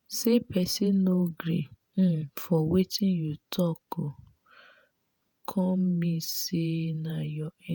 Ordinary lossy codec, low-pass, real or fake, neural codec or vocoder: none; none; fake; vocoder, 48 kHz, 128 mel bands, Vocos